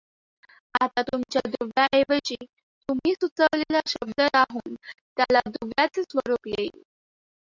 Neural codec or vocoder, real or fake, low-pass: none; real; 7.2 kHz